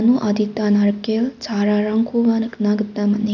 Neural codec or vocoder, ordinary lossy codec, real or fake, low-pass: none; none; real; 7.2 kHz